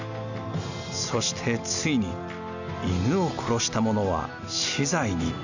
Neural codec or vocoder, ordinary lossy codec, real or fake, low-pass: none; none; real; 7.2 kHz